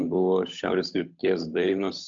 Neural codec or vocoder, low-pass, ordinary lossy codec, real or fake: codec, 16 kHz, 8 kbps, FunCodec, trained on Chinese and English, 25 frames a second; 7.2 kHz; MP3, 64 kbps; fake